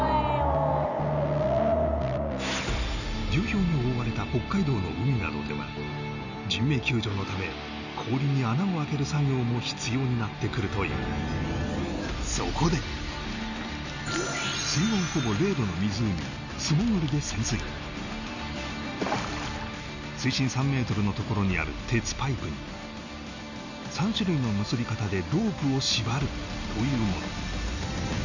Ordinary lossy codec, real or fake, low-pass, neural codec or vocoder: none; real; 7.2 kHz; none